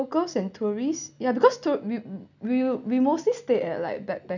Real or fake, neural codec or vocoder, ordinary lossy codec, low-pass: real; none; none; 7.2 kHz